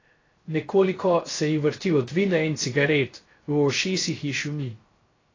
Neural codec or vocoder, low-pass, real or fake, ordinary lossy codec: codec, 16 kHz, 0.3 kbps, FocalCodec; 7.2 kHz; fake; AAC, 32 kbps